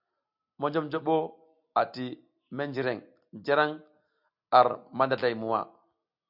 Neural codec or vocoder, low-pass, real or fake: none; 5.4 kHz; real